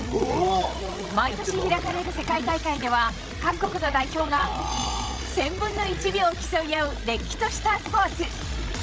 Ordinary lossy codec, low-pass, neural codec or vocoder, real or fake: none; none; codec, 16 kHz, 16 kbps, FreqCodec, larger model; fake